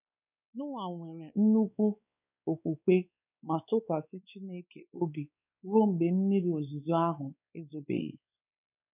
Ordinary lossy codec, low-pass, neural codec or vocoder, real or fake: none; 3.6 kHz; codec, 16 kHz, 4 kbps, X-Codec, WavLM features, trained on Multilingual LibriSpeech; fake